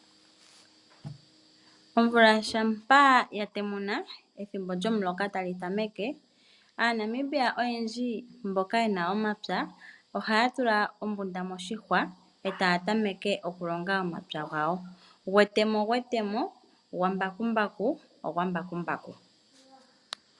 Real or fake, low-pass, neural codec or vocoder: real; 10.8 kHz; none